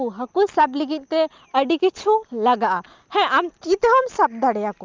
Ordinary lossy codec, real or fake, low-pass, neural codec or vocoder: Opus, 24 kbps; real; 7.2 kHz; none